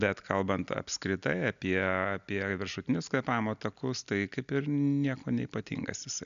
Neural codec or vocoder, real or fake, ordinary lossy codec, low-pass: none; real; AAC, 96 kbps; 7.2 kHz